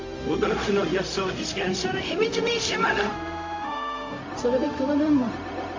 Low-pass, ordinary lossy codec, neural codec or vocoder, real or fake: 7.2 kHz; MP3, 48 kbps; codec, 16 kHz, 0.4 kbps, LongCat-Audio-Codec; fake